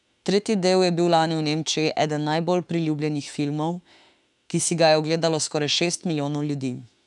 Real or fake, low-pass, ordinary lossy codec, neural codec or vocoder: fake; 10.8 kHz; none; autoencoder, 48 kHz, 32 numbers a frame, DAC-VAE, trained on Japanese speech